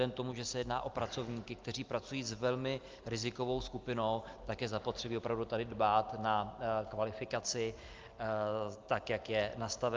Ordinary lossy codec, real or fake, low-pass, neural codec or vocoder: Opus, 24 kbps; real; 7.2 kHz; none